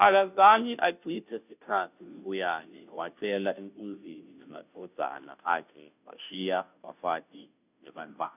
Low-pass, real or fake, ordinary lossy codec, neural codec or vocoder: 3.6 kHz; fake; none; codec, 16 kHz, 0.5 kbps, FunCodec, trained on Chinese and English, 25 frames a second